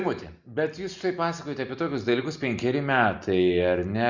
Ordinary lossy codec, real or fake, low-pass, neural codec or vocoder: Opus, 64 kbps; real; 7.2 kHz; none